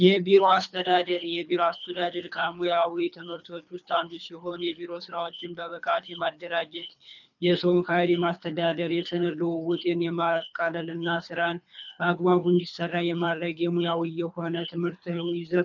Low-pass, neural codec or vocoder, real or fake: 7.2 kHz; codec, 24 kHz, 3 kbps, HILCodec; fake